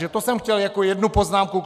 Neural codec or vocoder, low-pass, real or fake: none; 14.4 kHz; real